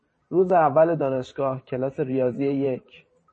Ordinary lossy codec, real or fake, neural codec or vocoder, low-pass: MP3, 32 kbps; fake; vocoder, 44.1 kHz, 128 mel bands every 512 samples, BigVGAN v2; 10.8 kHz